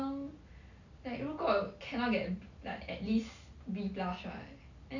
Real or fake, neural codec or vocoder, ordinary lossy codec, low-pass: real; none; none; 7.2 kHz